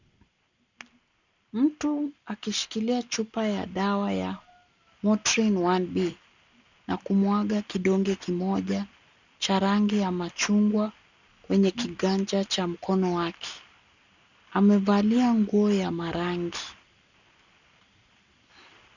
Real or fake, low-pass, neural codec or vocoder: real; 7.2 kHz; none